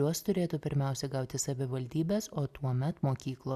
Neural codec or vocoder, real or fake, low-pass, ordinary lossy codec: none; real; 14.4 kHz; Opus, 64 kbps